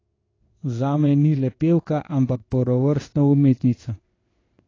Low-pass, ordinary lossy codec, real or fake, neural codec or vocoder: 7.2 kHz; AAC, 32 kbps; fake; codec, 16 kHz in and 24 kHz out, 1 kbps, XY-Tokenizer